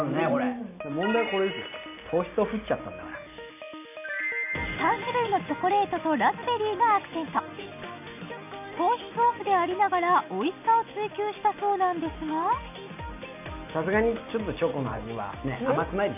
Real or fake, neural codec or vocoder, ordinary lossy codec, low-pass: real; none; none; 3.6 kHz